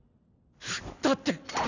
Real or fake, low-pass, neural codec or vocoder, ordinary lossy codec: real; 7.2 kHz; none; none